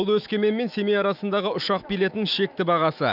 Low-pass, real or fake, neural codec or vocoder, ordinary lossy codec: 5.4 kHz; fake; autoencoder, 48 kHz, 128 numbers a frame, DAC-VAE, trained on Japanese speech; none